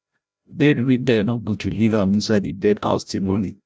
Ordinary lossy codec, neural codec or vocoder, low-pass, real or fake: none; codec, 16 kHz, 0.5 kbps, FreqCodec, larger model; none; fake